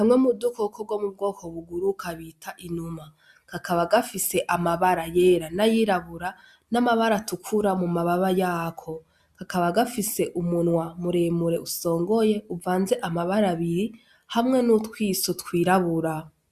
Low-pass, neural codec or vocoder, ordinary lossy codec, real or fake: 14.4 kHz; none; Opus, 64 kbps; real